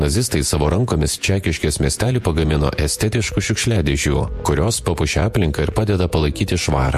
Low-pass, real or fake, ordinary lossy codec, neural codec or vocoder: 14.4 kHz; fake; MP3, 64 kbps; vocoder, 48 kHz, 128 mel bands, Vocos